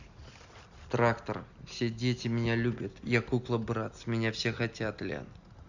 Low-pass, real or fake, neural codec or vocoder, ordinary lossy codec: 7.2 kHz; real; none; none